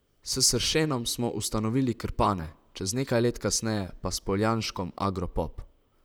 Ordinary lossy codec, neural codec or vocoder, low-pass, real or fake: none; vocoder, 44.1 kHz, 128 mel bands, Pupu-Vocoder; none; fake